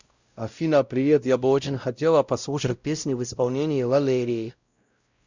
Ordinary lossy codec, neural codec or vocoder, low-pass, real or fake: Opus, 64 kbps; codec, 16 kHz, 0.5 kbps, X-Codec, WavLM features, trained on Multilingual LibriSpeech; 7.2 kHz; fake